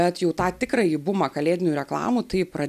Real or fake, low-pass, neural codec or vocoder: real; 14.4 kHz; none